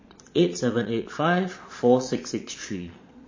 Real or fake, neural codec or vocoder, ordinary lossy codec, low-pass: fake; vocoder, 22.05 kHz, 80 mel bands, Vocos; MP3, 32 kbps; 7.2 kHz